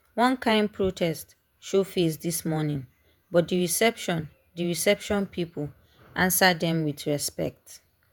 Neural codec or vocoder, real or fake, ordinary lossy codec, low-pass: vocoder, 48 kHz, 128 mel bands, Vocos; fake; none; none